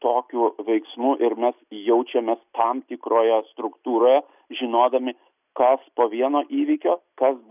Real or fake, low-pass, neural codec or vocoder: real; 3.6 kHz; none